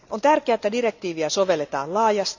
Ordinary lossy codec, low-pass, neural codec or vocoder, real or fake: none; 7.2 kHz; none; real